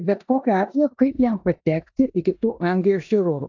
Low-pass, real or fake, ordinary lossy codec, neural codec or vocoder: 7.2 kHz; fake; AAC, 48 kbps; codec, 16 kHz in and 24 kHz out, 0.9 kbps, LongCat-Audio-Codec, four codebook decoder